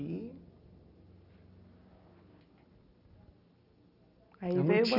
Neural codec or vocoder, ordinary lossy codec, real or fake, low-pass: none; none; real; 5.4 kHz